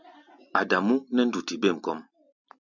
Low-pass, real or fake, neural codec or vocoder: 7.2 kHz; real; none